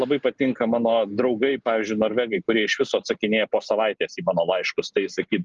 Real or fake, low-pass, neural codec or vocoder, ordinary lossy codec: real; 7.2 kHz; none; Opus, 32 kbps